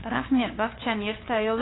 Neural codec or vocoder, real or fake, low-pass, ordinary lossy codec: codec, 16 kHz, 2 kbps, FunCodec, trained on LibriTTS, 25 frames a second; fake; 7.2 kHz; AAC, 16 kbps